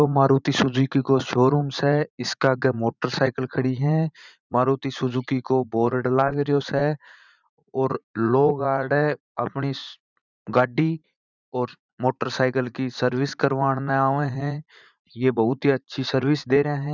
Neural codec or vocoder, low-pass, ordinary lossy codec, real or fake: vocoder, 44.1 kHz, 80 mel bands, Vocos; 7.2 kHz; none; fake